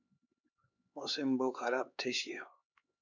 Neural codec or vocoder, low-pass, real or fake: codec, 16 kHz, 4 kbps, X-Codec, HuBERT features, trained on LibriSpeech; 7.2 kHz; fake